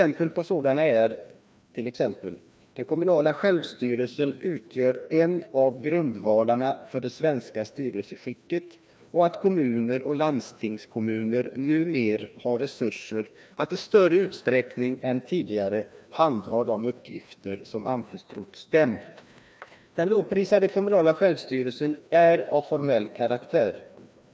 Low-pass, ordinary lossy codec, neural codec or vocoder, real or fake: none; none; codec, 16 kHz, 1 kbps, FreqCodec, larger model; fake